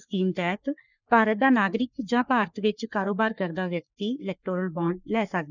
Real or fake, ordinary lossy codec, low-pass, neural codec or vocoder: fake; none; 7.2 kHz; codec, 44.1 kHz, 3.4 kbps, Pupu-Codec